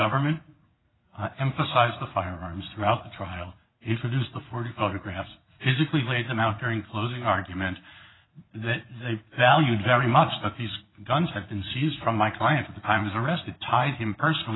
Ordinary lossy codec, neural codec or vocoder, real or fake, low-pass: AAC, 16 kbps; vocoder, 44.1 kHz, 80 mel bands, Vocos; fake; 7.2 kHz